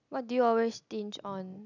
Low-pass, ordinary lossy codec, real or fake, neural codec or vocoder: 7.2 kHz; none; real; none